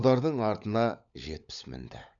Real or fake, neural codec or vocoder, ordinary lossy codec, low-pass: fake; codec, 16 kHz, 16 kbps, FunCodec, trained on LibriTTS, 50 frames a second; none; 7.2 kHz